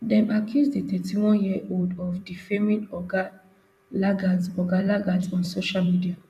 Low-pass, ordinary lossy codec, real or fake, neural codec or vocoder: 14.4 kHz; none; fake; vocoder, 44.1 kHz, 128 mel bands every 256 samples, BigVGAN v2